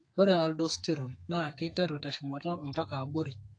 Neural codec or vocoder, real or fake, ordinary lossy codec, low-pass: codec, 32 kHz, 1.9 kbps, SNAC; fake; AAC, 48 kbps; 9.9 kHz